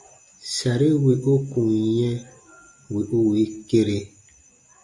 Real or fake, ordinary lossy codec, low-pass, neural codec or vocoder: real; MP3, 48 kbps; 10.8 kHz; none